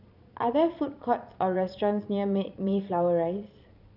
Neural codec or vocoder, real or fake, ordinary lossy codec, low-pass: vocoder, 22.05 kHz, 80 mel bands, Vocos; fake; none; 5.4 kHz